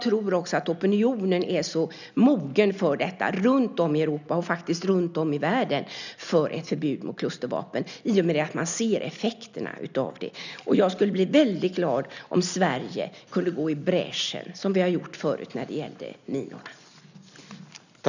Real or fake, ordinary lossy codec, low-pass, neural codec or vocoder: real; none; 7.2 kHz; none